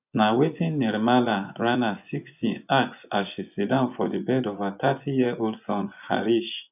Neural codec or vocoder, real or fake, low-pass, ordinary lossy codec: vocoder, 24 kHz, 100 mel bands, Vocos; fake; 3.6 kHz; none